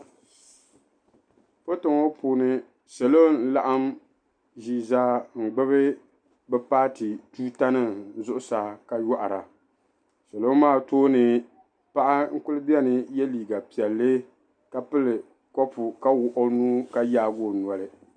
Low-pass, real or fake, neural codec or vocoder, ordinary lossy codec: 9.9 kHz; real; none; MP3, 96 kbps